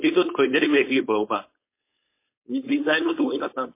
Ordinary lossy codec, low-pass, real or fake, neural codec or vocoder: MP3, 24 kbps; 3.6 kHz; fake; codec, 16 kHz, 4.8 kbps, FACodec